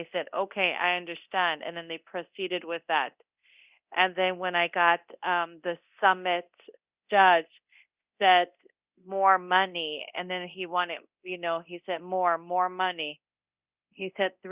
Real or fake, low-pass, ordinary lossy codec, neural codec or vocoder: fake; 3.6 kHz; Opus, 64 kbps; codec, 24 kHz, 0.9 kbps, DualCodec